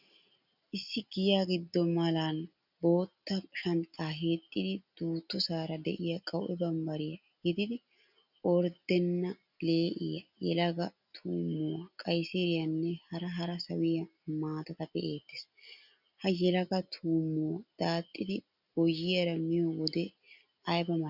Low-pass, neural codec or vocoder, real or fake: 5.4 kHz; none; real